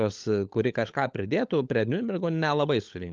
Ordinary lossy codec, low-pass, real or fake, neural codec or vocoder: Opus, 32 kbps; 7.2 kHz; fake; codec, 16 kHz, 16 kbps, FunCodec, trained on LibriTTS, 50 frames a second